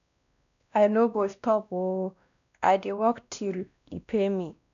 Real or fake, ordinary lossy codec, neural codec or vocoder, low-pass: fake; none; codec, 16 kHz, 1 kbps, X-Codec, WavLM features, trained on Multilingual LibriSpeech; 7.2 kHz